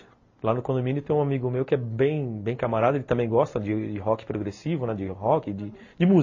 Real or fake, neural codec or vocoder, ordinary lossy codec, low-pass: real; none; MP3, 32 kbps; 7.2 kHz